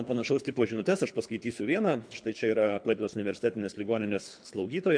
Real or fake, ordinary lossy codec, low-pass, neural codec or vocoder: fake; MP3, 64 kbps; 9.9 kHz; codec, 24 kHz, 3 kbps, HILCodec